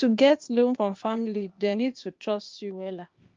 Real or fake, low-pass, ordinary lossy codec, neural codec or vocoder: fake; 7.2 kHz; Opus, 24 kbps; codec, 16 kHz, 0.8 kbps, ZipCodec